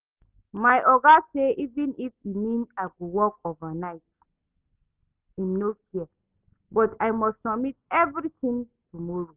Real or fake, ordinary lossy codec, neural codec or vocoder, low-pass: real; Opus, 24 kbps; none; 3.6 kHz